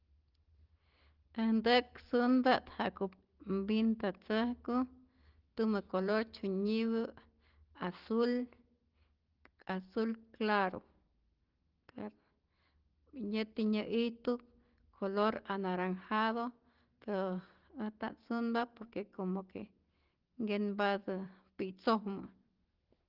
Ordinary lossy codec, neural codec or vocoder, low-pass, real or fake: Opus, 16 kbps; none; 5.4 kHz; real